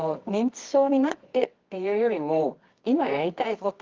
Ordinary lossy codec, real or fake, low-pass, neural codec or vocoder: Opus, 32 kbps; fake; 7.2 kHz; codec, 24 kHz, 0.9 kbps, WavTokenizer, medium music audio release